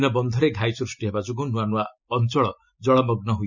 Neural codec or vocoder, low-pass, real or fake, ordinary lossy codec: none; 7.2 kHz; real; none